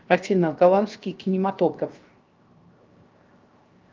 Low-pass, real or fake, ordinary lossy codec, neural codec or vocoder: 7.2 kHz; fake; Opus, 24 kbps; codec, 16 kHz, 0.7 kbps, FocalCodec